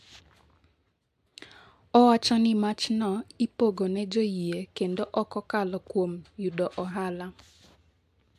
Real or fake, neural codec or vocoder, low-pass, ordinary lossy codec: real; none; 14.4 kHz; AAC, 96 kbps